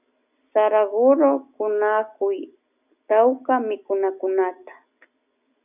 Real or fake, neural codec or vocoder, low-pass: real; none; 3.6 kHz